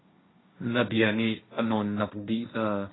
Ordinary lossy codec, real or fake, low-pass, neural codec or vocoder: AAC, 16 kbps; fake; 7.2 kHz; codec, 16 kHz, 1.1 kbps, Voila-Tokenizer